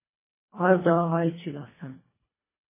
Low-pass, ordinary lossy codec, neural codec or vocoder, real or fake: 3.6 kHz; MP3, 16 kbps; codec, 24 kHz, 1.5 kbps, HILCodec; fake